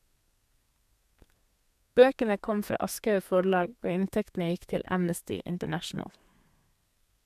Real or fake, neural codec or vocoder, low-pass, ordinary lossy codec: fake; codec, 32 kHz, 1.9 kbps, SNAC; 14.4 kHz; none